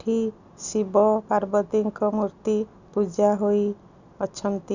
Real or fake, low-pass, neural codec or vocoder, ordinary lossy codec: real; 7.2 kHz; none; none